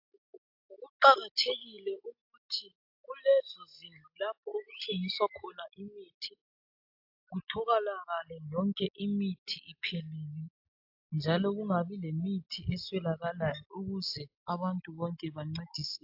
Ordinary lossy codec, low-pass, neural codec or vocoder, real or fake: AAC, 48 kbps; 5.4 kHz; none; real